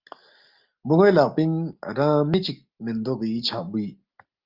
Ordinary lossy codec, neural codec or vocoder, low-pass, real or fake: Opus, 24 kbps; none; 5.4 kHz; real